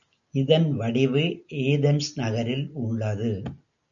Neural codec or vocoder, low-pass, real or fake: none; 7.2 kHz; real